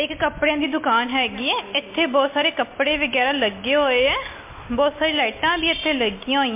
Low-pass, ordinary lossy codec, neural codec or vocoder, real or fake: 3.6 kHz; MP3, 24 kbps; none; real